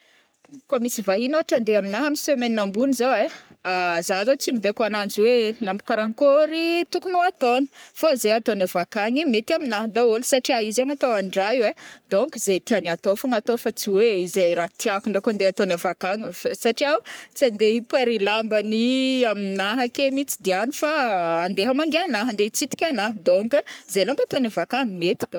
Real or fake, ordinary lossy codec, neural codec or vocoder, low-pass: fake; none; codec, 44.1 kHz, 3.4 kbps, Pupu-Codec; none